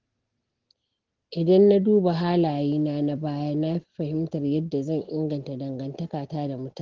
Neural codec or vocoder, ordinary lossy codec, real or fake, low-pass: none; Opus, 16 kbps; real; 7.2 kHz